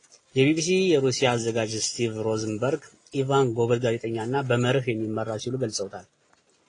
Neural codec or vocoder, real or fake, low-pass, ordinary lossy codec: none; real; 9.9 kHz; AAC, 32 kbps